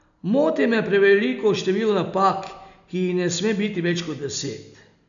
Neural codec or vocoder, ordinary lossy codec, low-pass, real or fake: none; none; 7.2 kHz; real